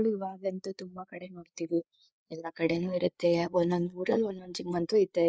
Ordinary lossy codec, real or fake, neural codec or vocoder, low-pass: none; fake; codec, 16 kHz, 4 kbps, FreqCodec, larger model; none